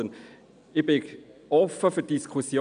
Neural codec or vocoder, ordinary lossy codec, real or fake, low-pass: none; none; real; 9.9 kHz